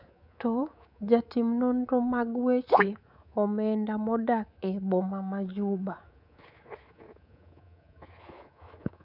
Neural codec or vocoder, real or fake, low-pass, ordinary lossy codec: codec, 24 kHz, 3.1 kbps, DualCodec; fake; 5.4 kHz; none